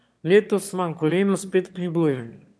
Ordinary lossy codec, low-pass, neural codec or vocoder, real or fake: none; none; autoencoder, 22.05 kHz, a latent of 192 numbers a frame, VITS, trained on one speaker; fake